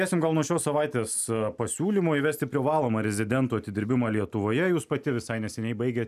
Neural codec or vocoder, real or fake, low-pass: none; real; 14.4 kHz